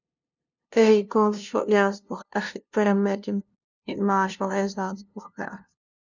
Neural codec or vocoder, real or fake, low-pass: codec, 16 kHz, 0.5 kbps, FunCodec, trained on LibriTTS, 25 frames a second; fake; 7.2 kHz